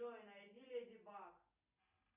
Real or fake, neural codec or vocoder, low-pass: real; none; 3.6 kHz